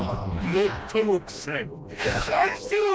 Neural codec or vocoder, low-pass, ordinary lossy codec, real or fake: codec, 16 kHz, 1 kbps, FreqCodec, smaller model; none; none; fake